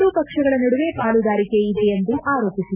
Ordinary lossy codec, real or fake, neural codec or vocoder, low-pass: none; real; none; 3.6 kHz